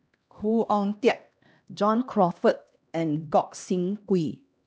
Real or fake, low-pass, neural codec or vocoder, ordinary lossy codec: fake; none; codec, 16 kHz, 1 kbps, X-Codec, HuBERT features, trained on LibriSpeech; none